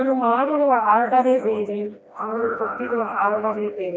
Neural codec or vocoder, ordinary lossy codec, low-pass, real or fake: codec, 16 kHz, 1 kbps, FreqCodec, smaller model; none; none; fake